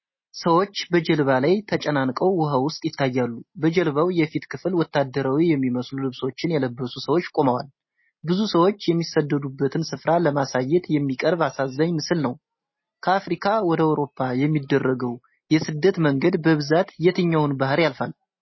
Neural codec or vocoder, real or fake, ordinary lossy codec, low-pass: none; real; MP3, 24 kbps; 7.2 kHz